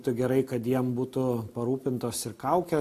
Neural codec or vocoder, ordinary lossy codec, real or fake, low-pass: none; AAC, 64 kbps; real; 14.4 kHz